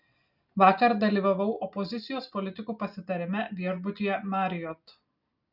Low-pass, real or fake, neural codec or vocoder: 5.4 kHz; real; none